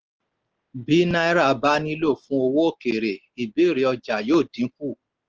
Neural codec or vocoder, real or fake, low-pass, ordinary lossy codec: none; real; 7.2 kHz; Opus, 16 kbps